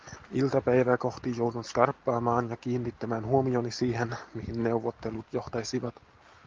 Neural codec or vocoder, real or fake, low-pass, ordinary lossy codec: none; real; 7.2 kHz; Opus, 32 kbps